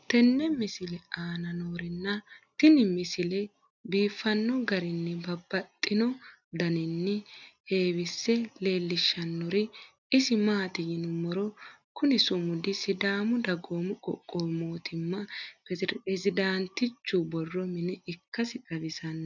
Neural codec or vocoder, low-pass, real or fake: none; 7.2 kHz; real